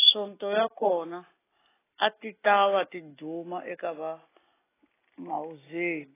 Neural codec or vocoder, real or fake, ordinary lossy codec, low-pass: none; real; AAC, 16 kbps; 3.6 kHz